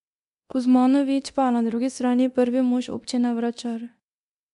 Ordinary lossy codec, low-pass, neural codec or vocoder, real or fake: none; 10.8 kHz; codec, 24 kHz, 0.9 kbps, DualCodec; fake